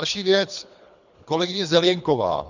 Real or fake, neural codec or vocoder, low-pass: fake; codec, 24 kHz, 3 kbps, HILCodec; 7.2 kHz